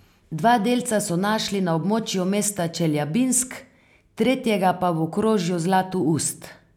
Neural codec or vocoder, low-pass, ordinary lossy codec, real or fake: none; 19.8 kHz; none; real